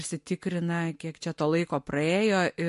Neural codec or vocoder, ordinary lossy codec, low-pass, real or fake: none; MP3, 48 kbps; 14.4 kHz; real